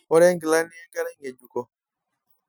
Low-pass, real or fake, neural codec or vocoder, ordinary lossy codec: none; real; none; none